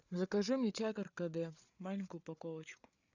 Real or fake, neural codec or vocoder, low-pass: fake; codec, 16 kHz, 8 kbps, FreqCodec, larger model; 7.2 kHz